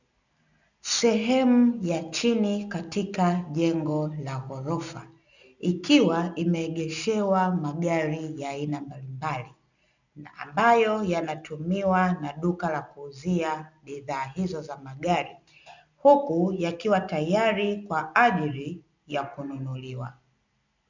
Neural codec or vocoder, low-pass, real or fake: none; 7.2 kHz; real